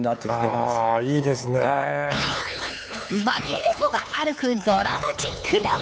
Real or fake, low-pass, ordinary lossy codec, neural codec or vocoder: fake; none; none; codec, 16 kHz, 4 kbps, X-Codec, HuBERT features, trained on LibriSpeech